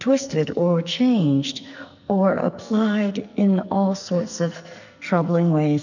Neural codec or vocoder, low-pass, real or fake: codec, 44.1 kHz, 2.6 kbps, SNAC; 7.2 kHz; fake